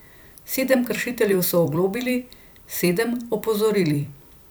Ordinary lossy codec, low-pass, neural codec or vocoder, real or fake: none; none; vocoder, 44.1 kHz, 128 mel bands every 256 samples, BigVGAN v2; fake